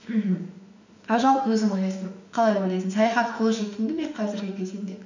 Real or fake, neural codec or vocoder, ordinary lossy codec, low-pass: fake; autoencoder, 48 kHz, 32 numbers a frame, DAC-VAE, trained on Japanese speech; none; 7.2 kHz